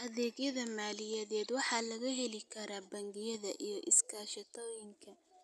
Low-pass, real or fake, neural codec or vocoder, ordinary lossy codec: none; real; none; none